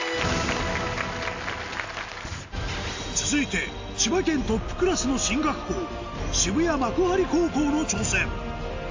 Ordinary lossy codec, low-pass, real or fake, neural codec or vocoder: AAC, 48 kbps; 7.2 kHz; real; none